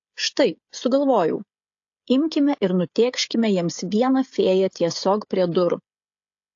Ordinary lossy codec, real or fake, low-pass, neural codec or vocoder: AAC, 48 kbps; fake; 7.2 kHz; codec, 16 kHz, 16 kbps, FreqCodec, smaller model